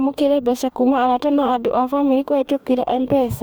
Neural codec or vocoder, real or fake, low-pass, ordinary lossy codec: codec, 44.1 kHz, 2.6 kbps, DAC; fake; none; none